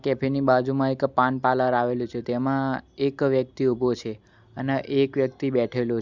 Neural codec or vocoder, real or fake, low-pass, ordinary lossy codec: none; real; 7.2 kHz; Opus, 64 kbps